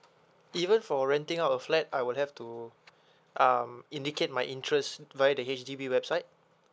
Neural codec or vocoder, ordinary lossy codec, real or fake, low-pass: none; none; real; none